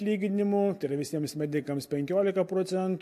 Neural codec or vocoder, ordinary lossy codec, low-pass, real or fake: none; MP3, 64 kbps; 14.4 kHz; real